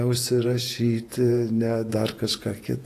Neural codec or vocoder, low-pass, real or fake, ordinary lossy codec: vocoder, 48 kHz, 128 mel bands, Vocos; 14.4 kHz; fake; AAC, 48 kbps